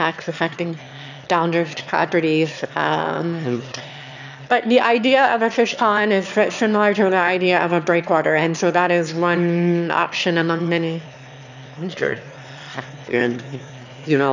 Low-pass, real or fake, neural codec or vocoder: 7.2 kHz; fake; autoencoder, 22.05 kHz, a latent of 192 numbers a frame, VITS, trained on one speaker